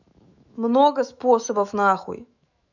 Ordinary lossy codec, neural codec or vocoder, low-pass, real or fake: none; autoencoder, 48 kHz, 128 numbers a frame, DAC-VAE, trained on Japanese speech; 7.2 kHz; fake